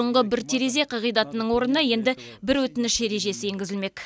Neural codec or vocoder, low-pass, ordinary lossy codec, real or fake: none; none; none; real